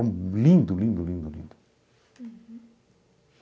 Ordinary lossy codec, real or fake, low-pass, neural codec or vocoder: none; real; none; none